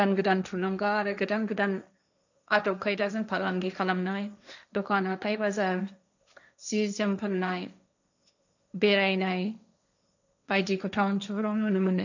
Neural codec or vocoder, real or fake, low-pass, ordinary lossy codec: codec, 16 kHz, 1.1 kbps, Voila-Tokenizer; fake; 7.2 kHz; none